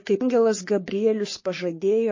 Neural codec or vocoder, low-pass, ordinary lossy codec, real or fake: codec, 44.1 kHz, 3.4 kbps, Pupu-Codec; 7.2 kHz; MP3, 32 kbps; fake